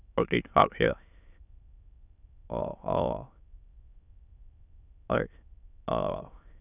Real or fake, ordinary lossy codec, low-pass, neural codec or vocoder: fake; none; 3.6 kHz; autoencoder, 22.05 kHz, a latent of 192 numbers a frame, VITS, trained on many speakers